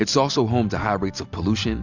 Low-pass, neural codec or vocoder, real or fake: 7.2 kHz; none; real